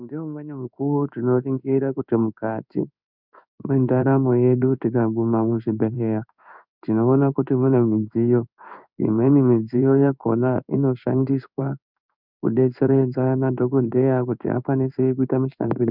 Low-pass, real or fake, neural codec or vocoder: 5.4 kHz; fake; codec, 16 kHz in and 24 kHz out, 1 kbps, XY-Tokenizer